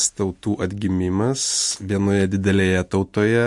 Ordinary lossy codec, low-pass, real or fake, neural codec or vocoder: MP3, 48 kbps; 10.8 kHz; real; none